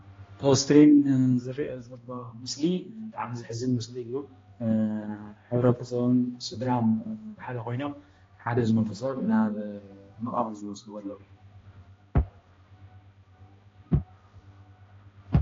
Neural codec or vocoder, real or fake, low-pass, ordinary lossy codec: codec, 16 kHz, 1 kbps, X-Codec, HuBERT features, trained on balanced general audio; fake; 7.2 kHz; AAC, 32 kbps